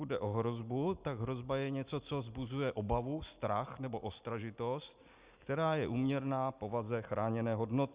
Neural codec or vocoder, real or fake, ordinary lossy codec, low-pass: none; real; Opus, 64 kbps; 3.6 kHz